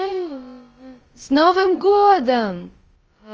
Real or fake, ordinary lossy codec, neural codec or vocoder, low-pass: fake; Opus, 24 kbps; codec, 16 kHz, about 1 kbps, DyCAST, with the encoder's durations; 7.2 kHz